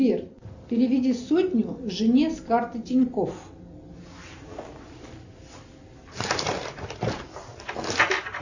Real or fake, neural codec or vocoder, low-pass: real; none; 7.2 kHz